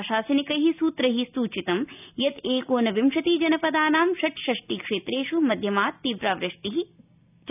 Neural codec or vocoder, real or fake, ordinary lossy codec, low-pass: none; real; none; 3.6 kHz